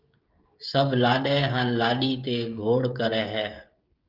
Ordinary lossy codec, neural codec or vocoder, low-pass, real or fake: Opus, 32 kbps; codec, 16 kHz, 16 kbps, FreqCodec, smaller model; 5.4 kHz; fake